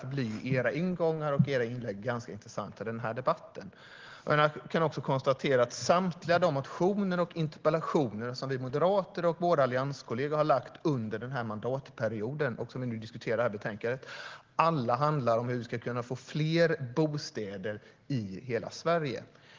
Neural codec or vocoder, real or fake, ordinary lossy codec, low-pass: none; real; Opus, 16 kbps; 7.2 kHz